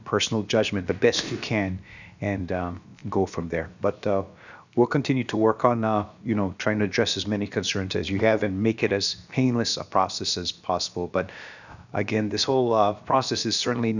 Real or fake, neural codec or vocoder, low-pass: fake; codec, 16 kHz, about 1 kbps, DyCAST, with the encoder's durations; 7.2 kHz